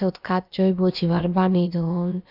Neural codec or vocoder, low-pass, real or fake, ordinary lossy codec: codec, 16 kHz, about 1 kbps, DyCAST, with the encoder's durations; 5.4 kHz; fake; none